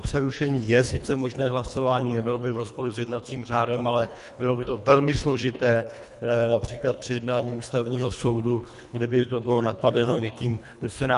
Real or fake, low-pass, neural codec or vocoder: fake; 10.8 kHz; codec, 24 kHz, 1.5 kbps, HILCodec